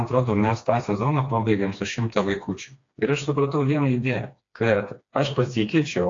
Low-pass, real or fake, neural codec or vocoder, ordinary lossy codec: 7.2 kHz; fake; codec, 16 kHz, 2 kbps, FreqCodec, smaller model; AAC, 48 kbps